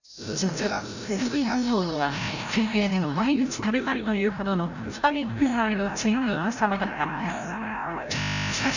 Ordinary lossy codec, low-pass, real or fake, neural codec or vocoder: none; 7.2 kHz; fake; codec, 16 kHz, 0.5 kbps, FreqCodec, larger model